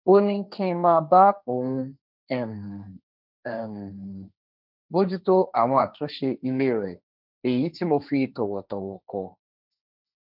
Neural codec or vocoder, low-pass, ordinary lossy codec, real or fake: codec, 16 kHz, 1.1 kbps, Voila-Tokenizer; 5.4 kHz; none; fake